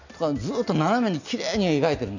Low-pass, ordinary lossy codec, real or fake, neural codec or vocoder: 7.2 kHz; none; real; none